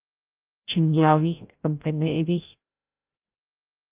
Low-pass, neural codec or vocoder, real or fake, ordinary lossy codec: 3.6 kHz; codec, 16 kHz, 0.5 kbps, FreqCodec, larger model; fake; Opus, 32 kbps